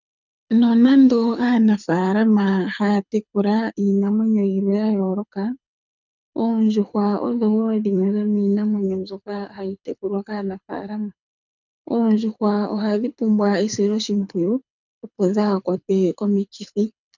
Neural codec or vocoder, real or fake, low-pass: codec, 24 kHz, 6 kbps, HILCodec; fake; 7.2 kHz